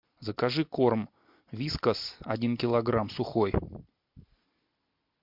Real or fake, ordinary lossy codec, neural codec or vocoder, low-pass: real; MP3, 48 kbps; none; 5.4 kHz